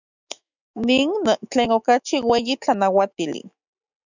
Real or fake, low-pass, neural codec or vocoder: fake; 7.2 kHz; autoencoder, 48 kHz, 128 numbers a frame, DAC-VAE, trained on Japanese speech